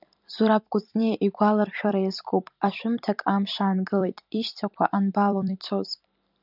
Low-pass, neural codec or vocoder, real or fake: 5.4 kHz; vocoder, 44.1 kHz, 128 mel bands every 256 samples, BigVGAN v2; fake